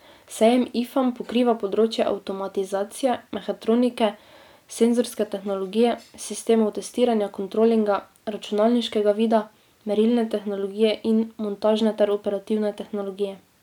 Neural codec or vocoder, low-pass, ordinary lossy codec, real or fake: none; 19.8 kHz; none; real